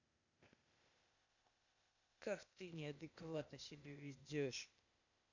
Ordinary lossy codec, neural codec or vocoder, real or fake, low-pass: none; codec, 16 kHz, 0.8 kbps, ZipCodec; fake; 7.2 kHz